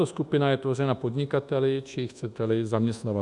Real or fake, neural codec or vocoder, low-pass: fake; codec, 24 kHz, 1.2 kbps, DualCodec; 10.8 kHz